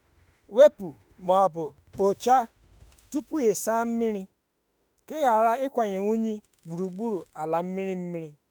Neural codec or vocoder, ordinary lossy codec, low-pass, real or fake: autoencoder, 48 kHz, 32 numbers a frame, DAC-VAE, trained on Japanese speech; none; none; fake